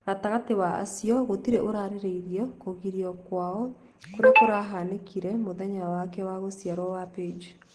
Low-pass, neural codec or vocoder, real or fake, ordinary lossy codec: 10.8 kHz; none; real; Opus, 16 kbps